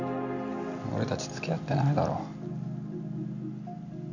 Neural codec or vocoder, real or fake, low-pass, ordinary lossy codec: none; real; 7.2 kHz; none